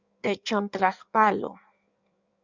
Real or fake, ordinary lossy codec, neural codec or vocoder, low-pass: fake; Opus, 64 kbps; codec, 16 kHz in and 24 kHz out, 1.1 kbps, FireRedTTS-2 codec; 7.2 kHz